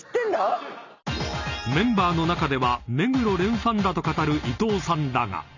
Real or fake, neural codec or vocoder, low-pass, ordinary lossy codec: real; none; 7.2 kHz; none